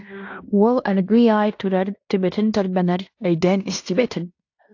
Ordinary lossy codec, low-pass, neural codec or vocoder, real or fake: AAC, 48 kbps; 7.2 kHz; codec, 16 kHz in and 24 kHz out, 0.9 kbps, LongCat-Audio-Codec, fine tuned four codebook decoder; fake